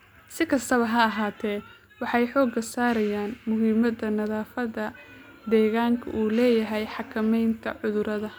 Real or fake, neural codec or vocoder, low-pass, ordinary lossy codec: real; none; none; none